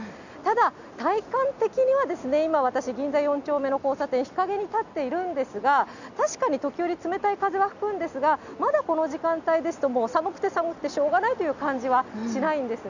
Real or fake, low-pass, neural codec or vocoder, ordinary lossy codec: real; 7.2 kHz; none; none